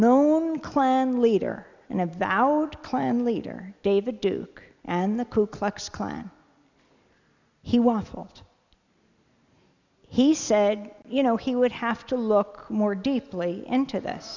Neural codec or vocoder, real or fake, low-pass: none; real; 7.2 kHz